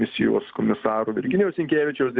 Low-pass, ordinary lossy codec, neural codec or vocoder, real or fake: 7.2 kHz; Opus, 64 kbps; none; real